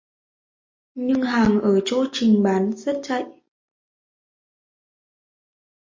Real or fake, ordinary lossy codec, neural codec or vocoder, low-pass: real; MP3, 32 kbps; none; 7.2 kHz